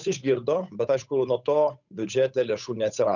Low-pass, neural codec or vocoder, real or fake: 7.2 kHz; codec, 16 kHz, 8 kbps, FunCodec, trained on Chinese and English, 25 frames a second; fake